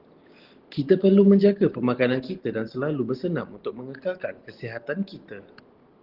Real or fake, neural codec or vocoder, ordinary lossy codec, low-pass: real; none; Opus, 16 kbps; 5.4 kHz